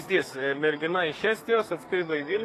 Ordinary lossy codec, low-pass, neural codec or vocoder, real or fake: AAC, 48 kbps; 14.4 kHz; codec, 32 kHz, 1.9 kbps, SNAC; fake